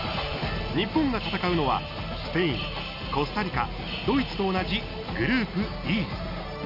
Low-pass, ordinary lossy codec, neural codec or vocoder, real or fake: 5.4 kHz; none; none; real